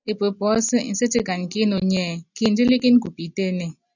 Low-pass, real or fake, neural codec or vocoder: 7.2 kHz; real; none